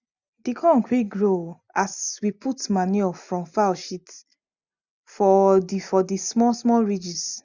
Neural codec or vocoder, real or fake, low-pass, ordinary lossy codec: none; real; 7.2 kHz; none